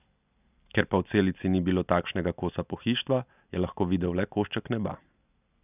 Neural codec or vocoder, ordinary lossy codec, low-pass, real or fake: none; none; 3.6 kHz; real